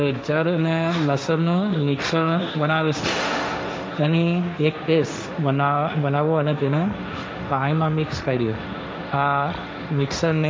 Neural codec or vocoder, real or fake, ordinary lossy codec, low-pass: codec, 16 kHz, 1.1 kbps, Voila-Tokenizer; fake; none; none